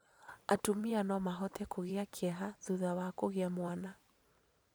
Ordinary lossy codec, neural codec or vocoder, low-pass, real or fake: none; vocoder, 44.1 kHz, 128 mel bands every 512 samples, BigVGAN v2; none; fake